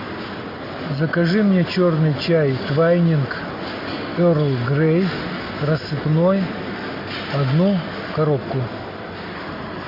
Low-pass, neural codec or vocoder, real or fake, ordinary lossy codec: 5.4 kHz; none; real; MP3, 32 kbps